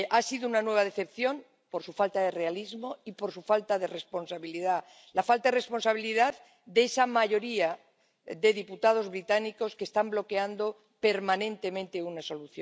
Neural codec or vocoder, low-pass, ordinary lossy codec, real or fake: none; none; none; real